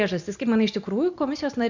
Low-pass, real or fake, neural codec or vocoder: 7.2 kHz; real; none